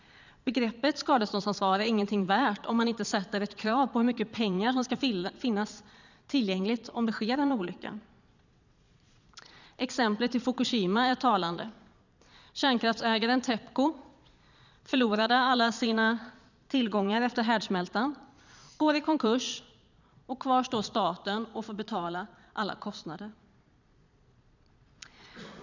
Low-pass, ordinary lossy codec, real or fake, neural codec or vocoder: 7.2 kHz; none; fake; vocoder, 22.05 kHz, 80 mel bands, Vocos